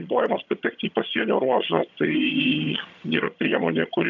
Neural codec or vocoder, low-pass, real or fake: vocoder, 22.05 kHz, 80 mel bands, HiFi-GAN; 7.2 kHz; fake